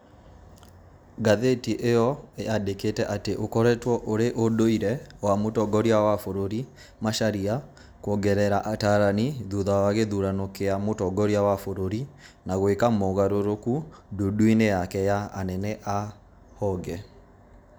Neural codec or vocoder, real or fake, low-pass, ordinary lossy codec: none; real; none; none